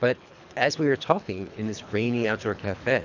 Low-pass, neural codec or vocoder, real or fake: 7.2 kHz; codec, 24 kHz, 3 kbps, HILCodec; fake